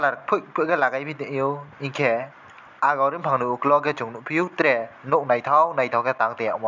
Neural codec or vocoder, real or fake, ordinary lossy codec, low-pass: none; real; none; 7.2 kHz